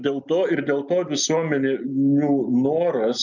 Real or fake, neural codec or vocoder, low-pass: real; none; 7.2 kHz